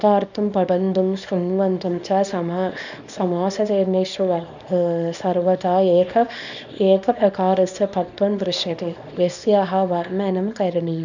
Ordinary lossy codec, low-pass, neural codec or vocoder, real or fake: none; 7.2 kHz; codec, 24 kHz, 0.9 kbps, WavTokenizer, small release; fake